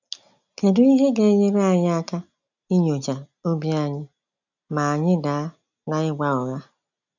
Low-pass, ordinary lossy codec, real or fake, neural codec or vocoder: 7.2 kHz; none; real; none